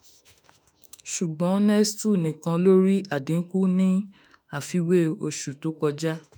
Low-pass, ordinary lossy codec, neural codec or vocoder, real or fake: none; none; autoencoder, 48 kHz, 32 numbers a frame, DAC-VAE, trained on Japanese speech; fake